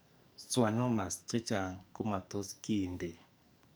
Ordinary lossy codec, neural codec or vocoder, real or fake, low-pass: none; codec, 44.1 kHz, 2.6 kbps, SNAC; fake; none